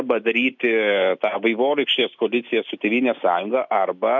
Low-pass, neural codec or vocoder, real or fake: 7.2 kHz; none; real